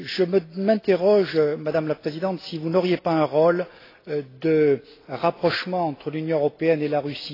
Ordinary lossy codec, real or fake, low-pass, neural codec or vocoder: AAC, 24 kbps; real; 5.4 kHz; none